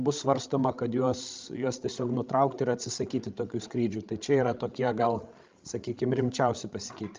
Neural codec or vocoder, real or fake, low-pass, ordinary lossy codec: codec, 16 kHz, 16 kbps, FreqCodec, larger model; fake; 7.2 kHz; Opus, 24 kbps